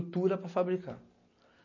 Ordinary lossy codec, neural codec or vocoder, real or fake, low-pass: MP3, 32 kbps; none; real; 7.2 kHz